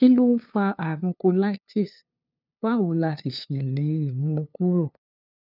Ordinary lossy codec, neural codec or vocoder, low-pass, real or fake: none; codec, 16 kHz, 2 kbps, FunCodec, trained on LibriTTS, 25 frames a second; 5.4 kHz; fake